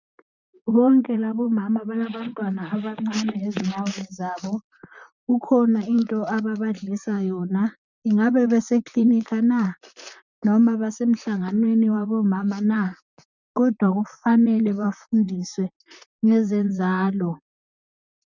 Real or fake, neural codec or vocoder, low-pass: fake; vocoder, 44.1 kHz, 128 mel bands, Pupu-Vocoder; 7.2 kHz